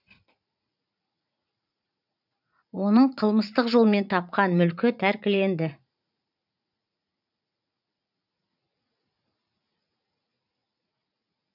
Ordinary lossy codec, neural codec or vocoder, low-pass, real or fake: none; vocoder, 44.1 kHz, 80 mel bands, Vocos; 5.4 kHz; fake